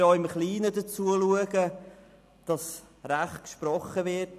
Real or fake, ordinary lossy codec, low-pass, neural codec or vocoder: real; none; 14.4 kHz; none